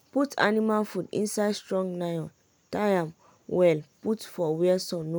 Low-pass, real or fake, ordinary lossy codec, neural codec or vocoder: none; real; none; none